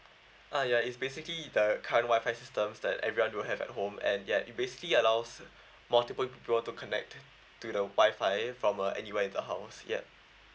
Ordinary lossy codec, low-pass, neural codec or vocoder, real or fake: none; none; none; real